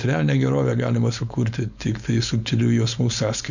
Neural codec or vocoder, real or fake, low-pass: codec, 16 kHz, 4.8 kbps, FACodec; fake; 7.2 kHz